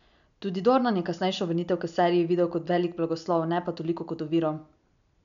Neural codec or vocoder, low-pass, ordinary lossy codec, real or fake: none; 7.2 kHz; none; real